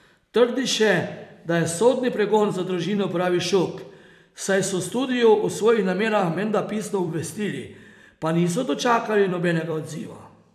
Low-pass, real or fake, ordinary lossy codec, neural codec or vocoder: 14.4 kHz; fake; none; vocoder, 44.1 kHz, 128 mel bands every 256 samples, BigVGAN v2